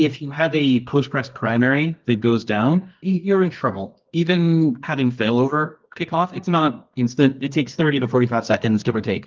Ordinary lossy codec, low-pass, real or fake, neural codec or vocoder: Opus, 32 kbps; 7.2 kHz; fake; codec, 24 kHz, 0.9 kbps, WavTokenizer, medium music audio release